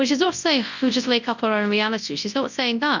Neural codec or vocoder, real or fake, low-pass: codec, 24 kHz, 0.9 kbps, WavTokenizer, large speech release; fake; 7.2 kHz